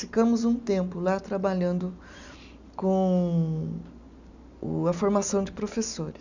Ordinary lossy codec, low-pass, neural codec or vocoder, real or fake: none; 7.2 kHz; none; real